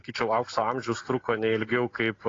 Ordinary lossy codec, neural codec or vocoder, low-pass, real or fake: AAC, 32 kbps; none; 7.2 kHz; real